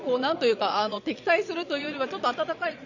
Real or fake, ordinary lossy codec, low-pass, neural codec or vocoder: fake; none; 7.2 kHz; vocoder, 22.05 kHz, 80 mel bands, Vocos